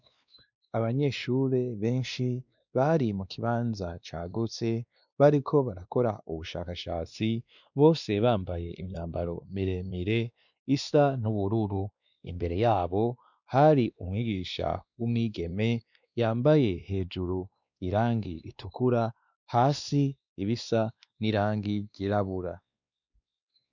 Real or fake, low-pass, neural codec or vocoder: fake; 7.2 kHz; codec, 16 kHz, 2 kbps, X-Codec, WavLM features, trained on Multilingual LibriSpeech